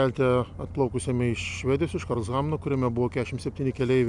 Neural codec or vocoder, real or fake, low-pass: none; real; 10.8 kHz